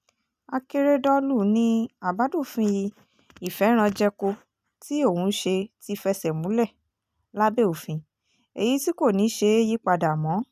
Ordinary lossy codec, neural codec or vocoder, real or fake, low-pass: none; none; real; 14.4 kHz